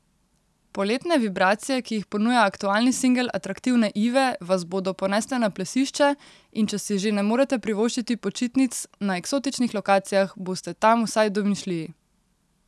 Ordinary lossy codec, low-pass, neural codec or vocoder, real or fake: none; none; none; real